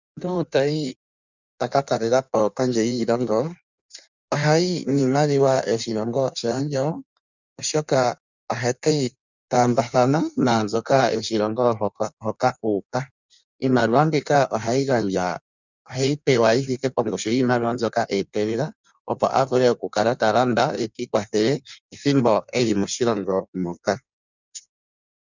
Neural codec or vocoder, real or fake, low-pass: codec, 16 kHz in and 24 kHz out, 1.1 kbps, FireRedTTS-2 codec; fake; 7.2 kHz